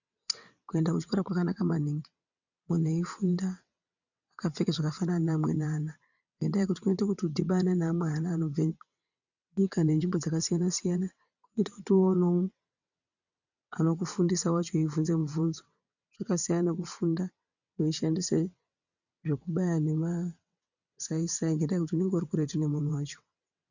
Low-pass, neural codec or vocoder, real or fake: 7.2 kHz; vocoder, 22.05 kHz, 80 mel bands, WaveNeXt; fake